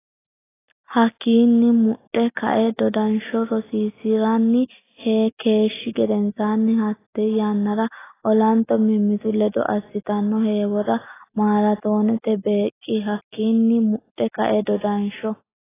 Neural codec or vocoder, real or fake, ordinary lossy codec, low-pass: none; real; AAC, 16 kbps; 3.6 kHz